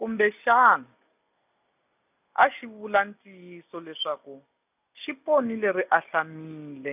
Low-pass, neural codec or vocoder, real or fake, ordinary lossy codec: 3.6 kHz; none; real; none